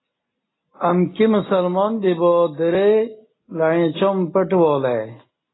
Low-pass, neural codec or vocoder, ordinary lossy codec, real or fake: 7.2 kHz; none; AAC, 16 kbps; real